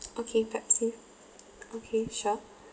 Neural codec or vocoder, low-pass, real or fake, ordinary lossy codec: none; none; real; none